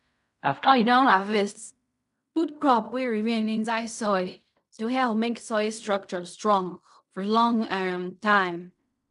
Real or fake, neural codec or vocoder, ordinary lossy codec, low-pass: fake; codec, 16 kHz in and 24 kHz out, 0.4 kbps, LongCat-Audio-Codec, fine tuned four codebook decoder; none; 10.8 kHz